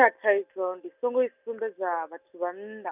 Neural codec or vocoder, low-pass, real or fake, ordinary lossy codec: none; 3.6 kHz; real; none